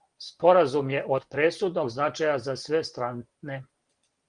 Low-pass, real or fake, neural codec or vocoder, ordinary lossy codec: 9.9 kHz; real; none; Opus, 24 kbps